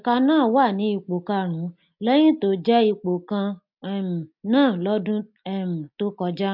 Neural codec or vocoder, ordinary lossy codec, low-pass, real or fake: none; MP3, 48 kbps; 5.4 kHz; real